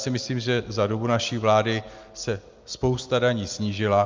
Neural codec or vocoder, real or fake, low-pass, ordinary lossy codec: none; real; 7.2 kHz; Opus, 24 kbps